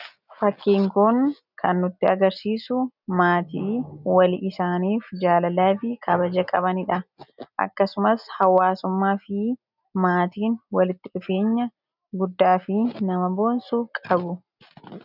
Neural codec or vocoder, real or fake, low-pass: none; real; 5.4 kHz